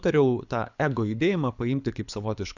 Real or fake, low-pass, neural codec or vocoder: fake; 7.2 kHz; codec, 24 kHz, 6 kbps, HILCodec